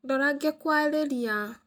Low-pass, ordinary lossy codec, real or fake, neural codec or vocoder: none; none; real; none